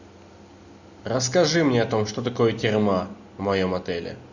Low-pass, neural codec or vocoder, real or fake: 7.2 kHz; none; real